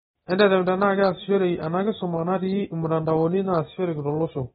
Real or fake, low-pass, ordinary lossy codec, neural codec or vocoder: real; 10.8 kHz; AAC, 16 kbps; none